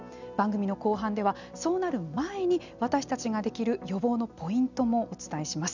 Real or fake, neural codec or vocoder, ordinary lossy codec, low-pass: real; none; none; 7.2 kHz